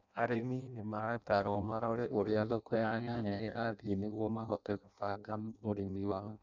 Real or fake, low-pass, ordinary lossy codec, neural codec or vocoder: fake; 7.2 kHz; none; codec, 16 kHz in and 24 kHz out, 0.6 kbps, FireRedTTS-2 codec